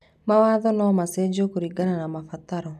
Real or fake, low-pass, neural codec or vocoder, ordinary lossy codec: real; 14.4 kHz; none; none